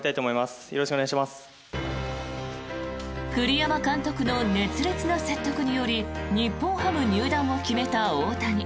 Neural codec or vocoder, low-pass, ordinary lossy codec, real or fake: none; none; none; real